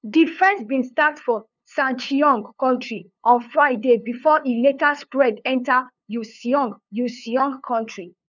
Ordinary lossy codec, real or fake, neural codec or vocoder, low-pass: none; fake; codec, 16 kHz, 2 kbps, FunCodec, trained on LibriTTS, 25 frames a second; 7.2 kHz